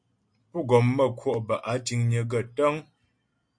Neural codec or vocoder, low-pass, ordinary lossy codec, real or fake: none; 9.9 kHz; MP3, 48 kbps; real